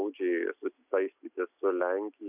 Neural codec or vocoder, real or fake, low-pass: none; real; 3.6 kHz